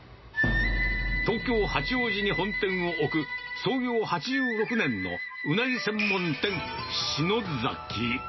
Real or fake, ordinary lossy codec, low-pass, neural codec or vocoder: real; MP3, 24 kbps; 7.2 kHz; none